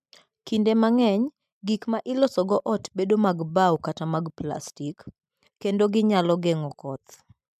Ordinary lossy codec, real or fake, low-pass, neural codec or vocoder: MP3, 96 kbps; real; 14.4 kHz; none